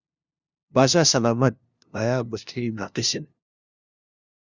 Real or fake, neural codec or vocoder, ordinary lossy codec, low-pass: fake; codec, 16 kHz, 0.5 kbps, FunCodec, trained on LibriTTS, 25 frames a second; Opus, 64 kbps; 7.2 kHz